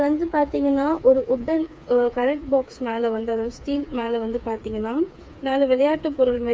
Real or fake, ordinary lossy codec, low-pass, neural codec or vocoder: fake; none; none; codec, 16 kHz, 4 kbps, FreqCodec, smaller model